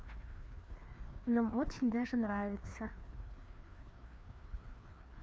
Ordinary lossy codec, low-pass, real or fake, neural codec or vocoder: none; none; fake; codec, 16 kHz, 2 kbps, FreqCodec, larger model